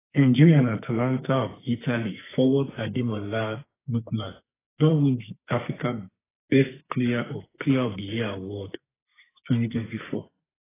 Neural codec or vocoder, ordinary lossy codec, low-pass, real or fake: codec, 44.1 kHz, 2.6 kbps, SNAC; AAC, 16 kbps; 3.6 kHz; fake